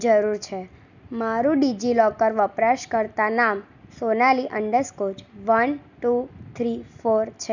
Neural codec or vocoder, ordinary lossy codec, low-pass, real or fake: none; none; 7.2 kHz; real